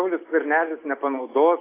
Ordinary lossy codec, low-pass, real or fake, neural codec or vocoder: AAC, 24 kbps; 3.6 kHz; real; none